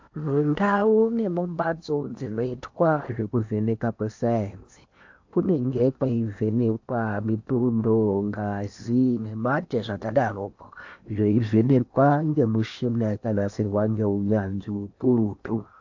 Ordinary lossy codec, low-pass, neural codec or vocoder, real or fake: AAC, 48 kbps; 7.2 kHz; codec, 16 kHz in and 24 kHz out, 0.8 kbps, FocalCodec, streaming, 65536 codes; fake